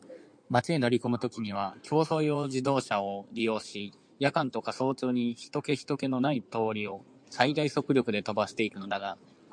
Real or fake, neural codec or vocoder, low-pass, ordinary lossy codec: fake; codec, 16 kHz in and 24 kHz out, 2.2 kbps, FireRedTTS-2 codec; 9.9 kHz; MP3, 64 kbps